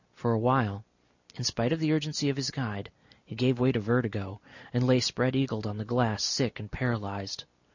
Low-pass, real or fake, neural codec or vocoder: 7.2 kHz; real; none